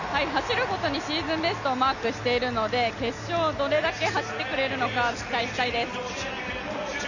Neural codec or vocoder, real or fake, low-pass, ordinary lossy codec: none; real; 7.2 kHz; none